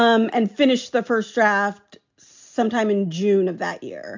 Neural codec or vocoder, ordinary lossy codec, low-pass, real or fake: none; MP3, 64 kbps; 7.2 kHz; real